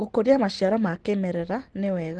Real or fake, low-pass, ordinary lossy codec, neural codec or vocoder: real; 10.8 kHz; Opus, 16 kbps; none